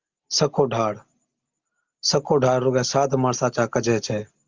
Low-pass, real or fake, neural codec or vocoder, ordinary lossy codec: 7.2 kHz; real; none; Opus, 32 kbps